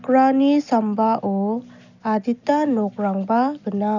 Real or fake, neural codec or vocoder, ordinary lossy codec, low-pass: real; none; none; 7.2 kHz